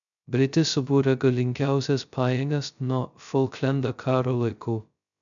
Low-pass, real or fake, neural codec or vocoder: 7.2 kHz; fake; codec, 16 kHz, 0.2 kbps, FocalCodec